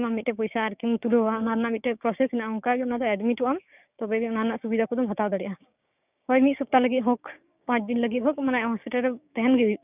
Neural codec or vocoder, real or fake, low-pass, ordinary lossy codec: codec, 16 kHz, 6 kbps, DAC; fake; 3.6 kHz; none